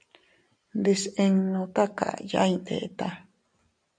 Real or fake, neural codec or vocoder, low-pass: real; none; 9.9 kHz